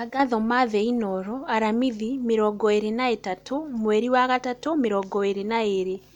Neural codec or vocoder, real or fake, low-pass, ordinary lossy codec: none; real; 19.8 kHz; none